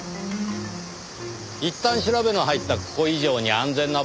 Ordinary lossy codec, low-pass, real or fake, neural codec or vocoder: none; none; real; none